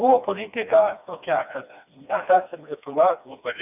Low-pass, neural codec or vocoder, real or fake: 3.6 kHz; codec, 16 kHz, 2 kbps, FreqCodec, smaller model; fake